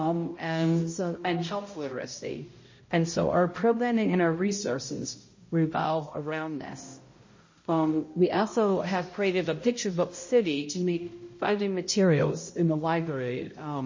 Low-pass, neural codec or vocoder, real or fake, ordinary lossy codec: 7.2 kHz; codec, 16 kHz, 0.5 kbps, X-Codec, HuBERT features, trained on balanced general audio; fake; MP3, 32 kbps